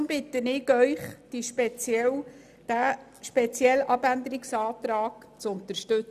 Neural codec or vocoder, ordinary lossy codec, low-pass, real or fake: none; none; 14.4 kHz; real